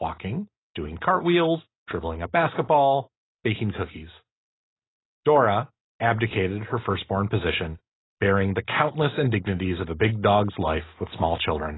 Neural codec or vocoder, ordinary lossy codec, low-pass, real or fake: none; AAC, 16 kbps; 7.2 kHz; real